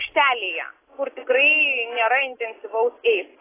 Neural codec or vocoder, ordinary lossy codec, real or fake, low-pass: none; AAC, 16 kbps; real; 3.6 kHz